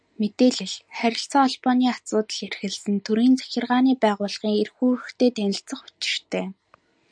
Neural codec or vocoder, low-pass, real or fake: none; 9.9 kHz; real